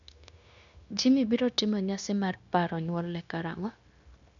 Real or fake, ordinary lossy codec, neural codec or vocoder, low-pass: fake; none; codec, 16 kHz, 0.9 kbps, LongCat-Audio-Codec; 7.2 kHz